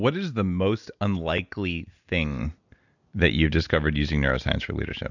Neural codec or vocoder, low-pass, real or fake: none; 7.2 kHz; real